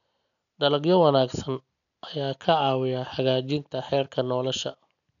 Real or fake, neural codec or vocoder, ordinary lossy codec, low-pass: real; none; none; 7.2 kHz